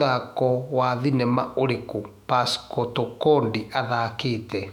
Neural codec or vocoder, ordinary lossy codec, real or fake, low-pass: autoencoder, 48 kHz, 128 numbers a frame, DAC-VAE, trained on Japanese speech; none; fake; 19.8 kHz